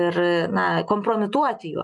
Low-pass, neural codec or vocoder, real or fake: 10.8 kHz; none; real